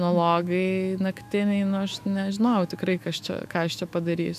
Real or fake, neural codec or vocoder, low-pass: fake; autoencoder, 48 kHz, 128 numbers a frame, DAC-VAE, trained on Japanese speech; 14.4 kHz